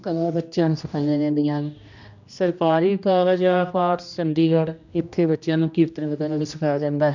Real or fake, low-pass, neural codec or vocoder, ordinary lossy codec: fake; 7.2 kHz; codec, 16 kHz, 1 kbps, X-Codec, HuBERT features, trained on general audio; none